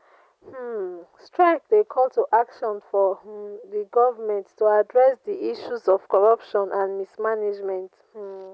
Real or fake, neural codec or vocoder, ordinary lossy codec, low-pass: real; none; none; none